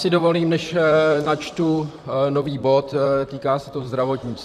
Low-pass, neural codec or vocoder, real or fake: 14.4 kHz; vocoder, 44.1 kHz, 128 mel bands, Pupu-Vocoder; fake